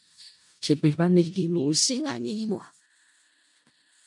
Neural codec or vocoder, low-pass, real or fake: codec, 16 kHz in and 24 kHz out, 0.4 kbps, LongCat-Audio-Codec, four codebook decoder; 10.8 kHz; fake